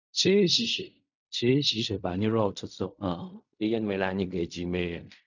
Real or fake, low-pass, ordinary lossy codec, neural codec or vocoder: fake; 7.2 kHz; none; codec, 16 kHz in and 24 kHz out, 0.4 kbps, LongCat-Audio-Codec, fine tuned four codebook decoder